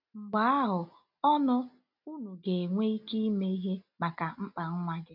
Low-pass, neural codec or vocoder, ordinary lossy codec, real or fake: 5.4 kHz; none; none; real